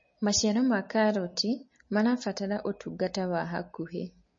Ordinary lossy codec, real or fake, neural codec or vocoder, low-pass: MP3, 32 kbps; real; none; 7.2 kHz